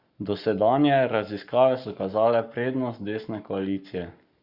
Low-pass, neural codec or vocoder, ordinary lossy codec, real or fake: 5.4 kHz; codec, 44.1 kHz, 7.8 kbps, Pupu-Codec; Opus, 64 kbps; fake